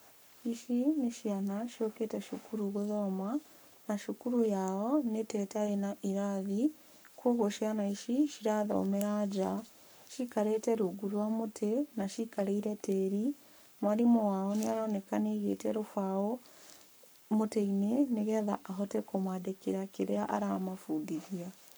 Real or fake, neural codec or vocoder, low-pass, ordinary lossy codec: fake; codec, 44.1 kHz, 7.8 kbps, Pupu-Codec; none; none